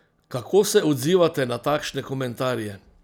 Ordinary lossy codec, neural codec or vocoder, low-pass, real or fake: none; none; none; real